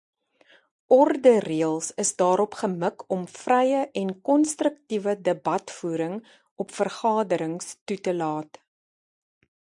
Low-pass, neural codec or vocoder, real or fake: 10.8 kHz; none; real